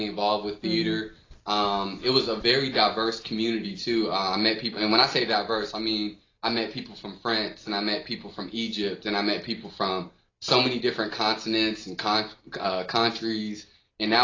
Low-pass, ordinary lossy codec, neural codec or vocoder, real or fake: 7.2 kHz; AAC, 32 kbps; none; real